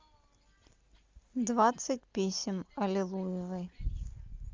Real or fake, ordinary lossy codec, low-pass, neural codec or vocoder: real; Opus, 32 kbps; 7.2 kHz; none